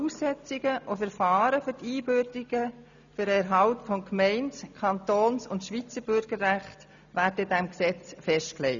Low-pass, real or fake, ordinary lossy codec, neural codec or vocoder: 7.2 kHz; real; none; none